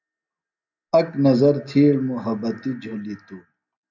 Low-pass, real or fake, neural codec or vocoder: 7.2 kHz; real; none